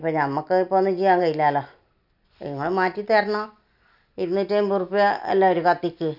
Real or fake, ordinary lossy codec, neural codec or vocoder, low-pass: real; none; none; 5.4 kHz